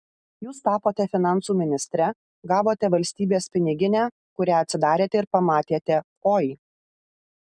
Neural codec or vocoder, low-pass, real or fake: none; 9.9 kHz; real